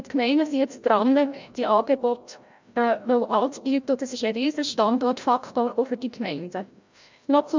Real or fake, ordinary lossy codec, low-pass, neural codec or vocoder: fake; MP3, 64 kbps; 7.2 kHz; codec, 16 kHz, 0.5 kbps, FreqCodec, larger model